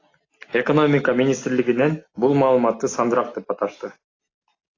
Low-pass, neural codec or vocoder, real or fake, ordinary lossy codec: 7.2 kHz; none; real; AAC, 32 kbps